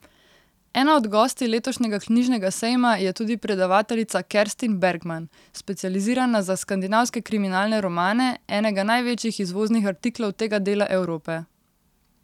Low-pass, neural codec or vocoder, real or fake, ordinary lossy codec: 19.8 kHz; none; real; none